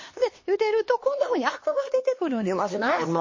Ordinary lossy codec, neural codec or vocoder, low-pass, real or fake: MP3, 32 kbps; codec, 16 kHz, 2 kbps, X-Codec, HuBERT features, trained on LibriSpeech; 7.2 kHz; fake